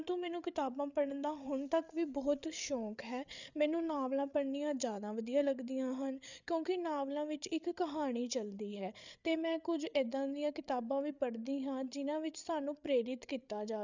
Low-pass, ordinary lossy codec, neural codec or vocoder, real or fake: 7.2 kHz; none; codec, 16 kHz, 8 kbps, FreqCodec, larger model; fake